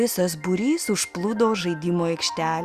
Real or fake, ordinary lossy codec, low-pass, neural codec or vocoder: real; Opus, 64 kbps; 14.4 kHz; none